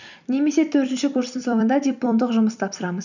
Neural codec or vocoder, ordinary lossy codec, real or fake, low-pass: vocoder, 44.1 kHz, 128 mel bands every 256 samples, BigVGAN v2; none; fake; 7.2 kHz